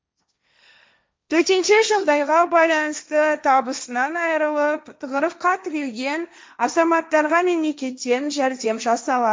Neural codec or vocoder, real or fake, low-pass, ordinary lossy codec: codec, 16 kHz, 1.1 kbps, Voila-Tokenizer; fake; none; none